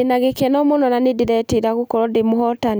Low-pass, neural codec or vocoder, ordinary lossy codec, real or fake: none; none; none; real